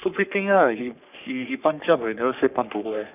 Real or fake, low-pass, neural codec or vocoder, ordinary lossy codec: fake; 3.6 kHz; codec, 44.1 kHz, 3.4 kbps, Pupu-Codec; none